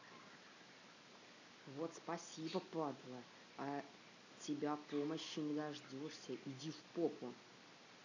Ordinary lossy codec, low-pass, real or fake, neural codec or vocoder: none; 7.2 kHz; real; none